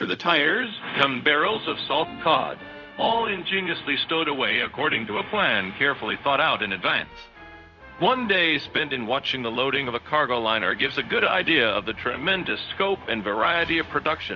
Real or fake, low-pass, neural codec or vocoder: fake; 7.2 kHz; codec, 16 kHz, 0.4 kbps, LongCat-Audio-Codec